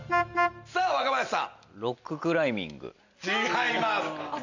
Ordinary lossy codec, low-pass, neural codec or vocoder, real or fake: none; 7.2 kHz; none; real